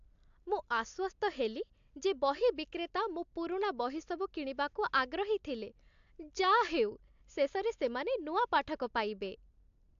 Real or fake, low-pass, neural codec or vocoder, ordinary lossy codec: real; 7.2 kHz; none; AAC, 64 kbps